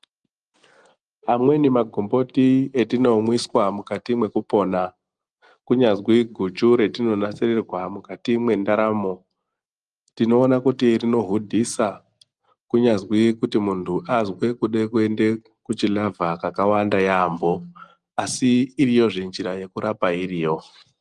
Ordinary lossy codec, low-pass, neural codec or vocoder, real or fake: Opus, 32 kbps; 10.8 kHz; none; real